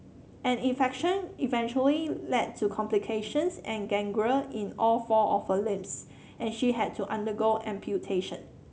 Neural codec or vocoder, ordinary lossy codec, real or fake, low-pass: none; none; real; none